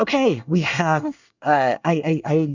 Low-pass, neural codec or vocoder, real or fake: 7.2 kHz; codec, 24 kHz, 1 kbps, SNAC; fake